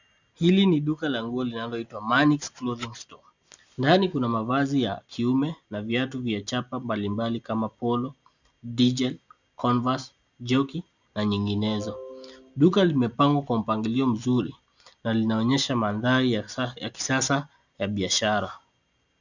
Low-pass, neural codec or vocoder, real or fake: 7.2 kHz; none; real